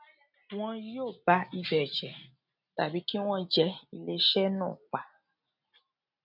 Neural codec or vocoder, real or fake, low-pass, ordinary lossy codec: none; real; 5.4 kHz; none